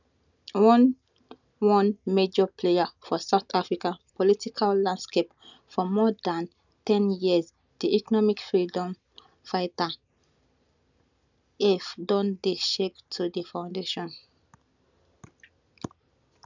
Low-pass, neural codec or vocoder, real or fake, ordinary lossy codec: 7.2 kHz; none; real; none